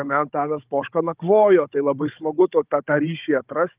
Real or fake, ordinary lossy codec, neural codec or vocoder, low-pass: fake; Opus, 32 kbps; codec, 16 kHz, 16 kbps, FunCodec, trained on Chinese and English, 50 frames a second; 3.6 kHz